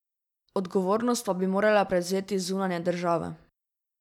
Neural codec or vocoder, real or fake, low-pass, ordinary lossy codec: none; real; 19.8 kHz; none